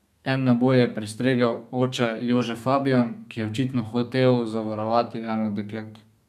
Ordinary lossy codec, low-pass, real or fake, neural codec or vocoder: none; 14.4 kHz; fake; codec, 32 kHz, 1.9 kbps, SNAC